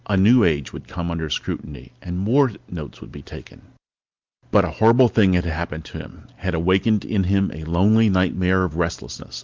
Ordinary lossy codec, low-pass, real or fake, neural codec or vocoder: Opus, 32 kbps; 7.2 kHz; real; none